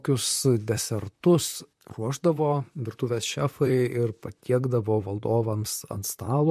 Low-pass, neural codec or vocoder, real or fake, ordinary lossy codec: 14.4 kHz; vocoder, 44.1 kHz, 128 mel bands, Pupu-Vocoder; fake; MP3, 64 kbps